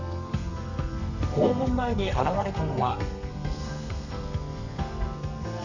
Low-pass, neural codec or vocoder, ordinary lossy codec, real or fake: 7.2 kHz; codec, 32 kHz, 1.9 kbps, SNAC; none; fake